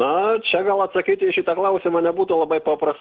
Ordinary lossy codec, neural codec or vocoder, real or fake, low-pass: Opus, 16 kbps; none; real; 7.2 kHz